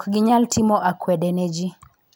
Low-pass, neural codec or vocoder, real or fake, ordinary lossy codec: none; none; real; none